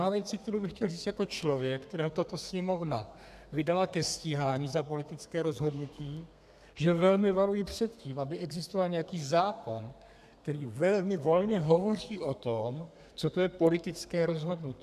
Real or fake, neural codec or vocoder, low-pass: fake; codec, 32 kHz, 1.9 kbps, SNAC; 14.4 kHz